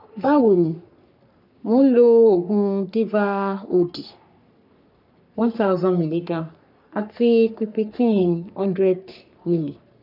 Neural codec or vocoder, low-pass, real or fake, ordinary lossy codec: codec, 44.1 kHz, 3.4 kbps, Pupu-Codec; 5.4 kHz; fake; none